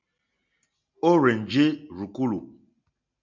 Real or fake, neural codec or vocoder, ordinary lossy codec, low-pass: real; none; MP3, 64 kbps; 7.2 kHz